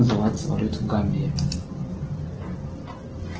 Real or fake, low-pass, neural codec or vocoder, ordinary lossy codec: real; 7.2 kHz; none; Opus, 16 kbps